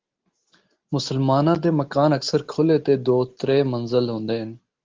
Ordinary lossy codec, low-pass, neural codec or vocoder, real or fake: Opus, 16 kbps; 7.2 kHz; none; real